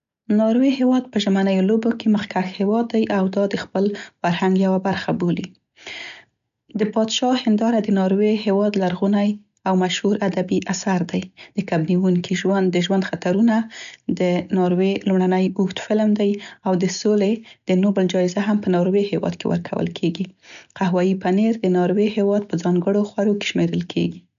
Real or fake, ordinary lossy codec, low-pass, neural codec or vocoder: real; none; 7.2 kHz; none